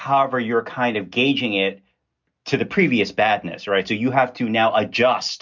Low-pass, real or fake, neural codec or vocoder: 7.2 kHz; real; none